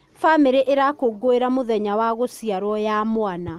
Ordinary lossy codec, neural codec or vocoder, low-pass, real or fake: Opus, 32 kbps; none; 14.4 kHz; real